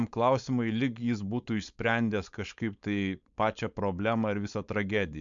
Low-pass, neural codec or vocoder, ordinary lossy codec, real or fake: 7.2 kHz; codec, 16 kHz, 4.8 kbps, FACodec; MP3, 64 kbps; fake